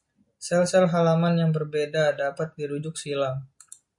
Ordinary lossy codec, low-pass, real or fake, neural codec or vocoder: MP3, 48 kbps; 10.8 kHz; real; none